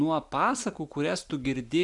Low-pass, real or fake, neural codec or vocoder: 10.8 kHz; real; none